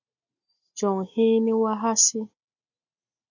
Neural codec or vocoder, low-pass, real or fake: none; 7.2 kHz; real